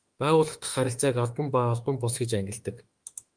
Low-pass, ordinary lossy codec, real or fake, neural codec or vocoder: 9.9 kHz; Opus, 32 kbps; fake; autoencoder, 48 kHz, 32 numbers a frame, DAC-VAE, trained on Japanese speech